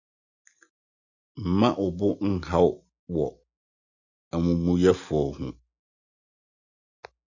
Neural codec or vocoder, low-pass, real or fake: none; 7.2 kHz; real